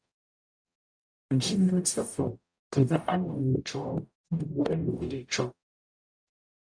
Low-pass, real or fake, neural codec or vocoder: 9.9 kHz; fake; codec, 44.1 kHz, 0.9 kbps, DAC